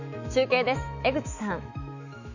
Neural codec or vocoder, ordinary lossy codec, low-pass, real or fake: autoencoder, 48 kHz, 128 numbers a frame, DAC-VAE, trained on Japanese speech; none; 7.2 kHz; fake